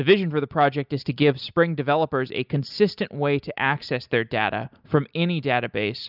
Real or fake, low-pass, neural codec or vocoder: real; 5.4 kHz; none